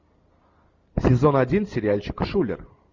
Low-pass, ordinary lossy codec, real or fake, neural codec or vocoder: 7.2 kHz; Opus, 64 kbps; real; none